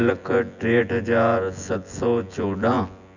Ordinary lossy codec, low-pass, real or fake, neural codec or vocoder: none; 7.2 kHz; fake; vocoder, 24 kHz, 100 mel bands, Vocos